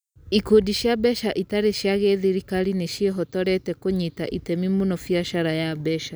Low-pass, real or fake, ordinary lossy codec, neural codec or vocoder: none; real; none; none